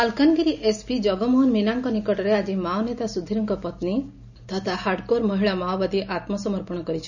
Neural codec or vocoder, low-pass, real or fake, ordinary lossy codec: none; 7.2 kHz; real; none